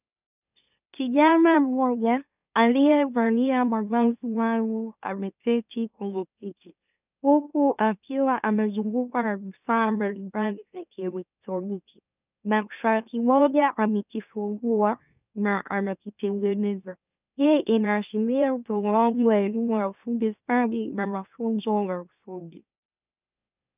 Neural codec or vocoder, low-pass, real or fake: autoencoder, 44.1 kHz, a latent of 192 numbers a frame, MeloTTS; 3.6 kHz; fake